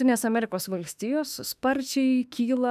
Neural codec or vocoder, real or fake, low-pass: autoencoder, 48 kHz, 32 numbers a frame, DAC-VAE, trained on Japanese speech; fake; 14.4 kHz